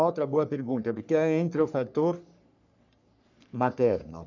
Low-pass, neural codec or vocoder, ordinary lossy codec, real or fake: 7.2 kHz; codec, 44.1 kHz, 3.4 kbps, Pupu-Codec; none; fake